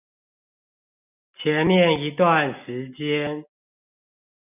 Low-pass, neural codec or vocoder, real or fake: 3.6 kHz; none; real